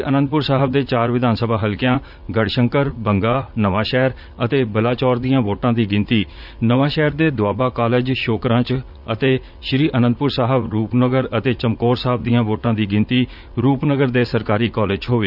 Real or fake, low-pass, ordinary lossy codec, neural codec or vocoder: fake; 5.4 kHz; none; vocoder, 44.1 kHz, 80 mel bands, Vocos